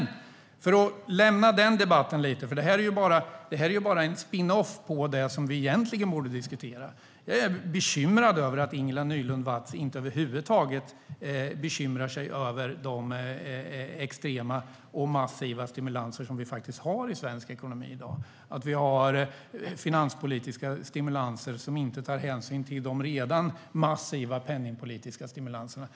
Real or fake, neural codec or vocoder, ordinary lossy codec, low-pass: real; none; none; none